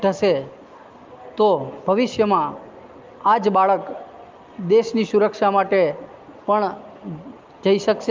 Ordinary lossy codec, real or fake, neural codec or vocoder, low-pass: Opus, 24 kbps; real; none; 7.2 kHz